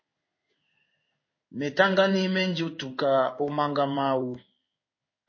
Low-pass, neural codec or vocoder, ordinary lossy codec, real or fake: 7.2 kHz; codec, 16 kHz in and 24 kHz out, 1 kbps, XY-Tokenizer; MP3, 32 kbps; fake